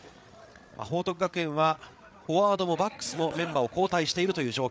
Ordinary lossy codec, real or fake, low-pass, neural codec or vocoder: none; fake; none; codec, 16 kHz, 8 kbps, FreqCodec, larger model